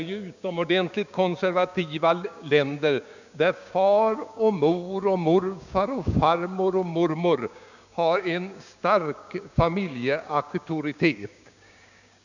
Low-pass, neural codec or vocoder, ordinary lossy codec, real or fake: 7.2 kHz; codec, 16 kHz, 6 kbps, DAC; none; fake